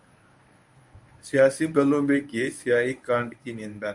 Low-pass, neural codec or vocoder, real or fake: 10.8 kHz; codec, 24 kHz, 0.9 kbps, WavTokenizer, medium speech release version 1; fake